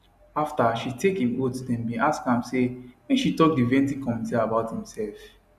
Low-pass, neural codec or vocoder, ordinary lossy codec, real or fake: 14.4 kHz; none; none; real